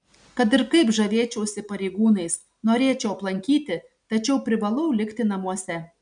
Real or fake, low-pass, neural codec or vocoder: real; 9.9 kHz; none